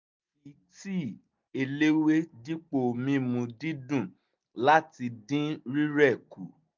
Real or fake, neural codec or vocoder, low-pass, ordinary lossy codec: real; none; 7.2 kHz; MP3, 64 kbps